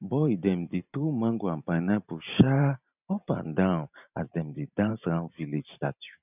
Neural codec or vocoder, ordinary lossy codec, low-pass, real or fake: vocoder, 44.1 kHz, 128 mel bands every 512 samples, BigVGAN v2; none; 3.6 kHz; fake